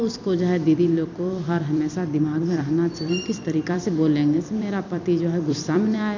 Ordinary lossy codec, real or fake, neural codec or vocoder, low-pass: none; fake; vocoder, 44.1 kHz, 128 mel bands every 256 samples, BigVGAN v2; 7.2 kHz